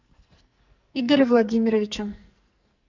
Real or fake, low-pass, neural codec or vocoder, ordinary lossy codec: fake; 7.2 kHz; codec, 44.1 kHz, 2.6 kbps, SNAC; MP3, 64 kbps